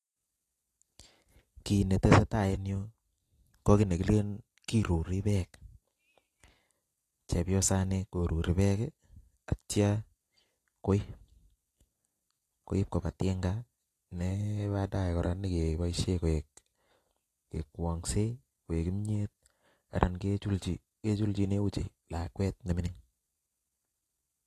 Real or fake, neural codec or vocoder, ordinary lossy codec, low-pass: real; none; AAC, 48 kbps; 14.4 kHz